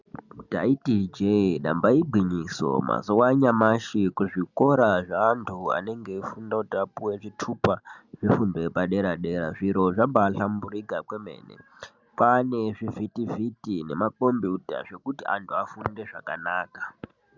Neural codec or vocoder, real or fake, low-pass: none; real; 7.2 kHz